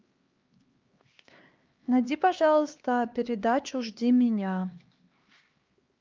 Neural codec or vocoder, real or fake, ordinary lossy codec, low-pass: codec, 16 kHz, 2 kbps, X-Codec, HuBERT features, trained on LibriSpeech; fake; Opus, 24 kbps; 7.2 kHz